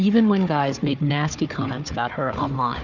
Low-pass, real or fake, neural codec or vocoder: 7.2 kHz; fake; codec, 16 kHz, 4 kbps, FunCodec, trained on Chinese and English, 50 frames a second